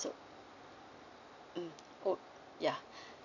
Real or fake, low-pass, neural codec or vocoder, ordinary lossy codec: real; 7.2 kHz; none; none